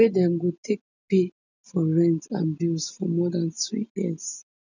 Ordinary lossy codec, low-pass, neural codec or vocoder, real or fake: none; 7.2 kHz; none; real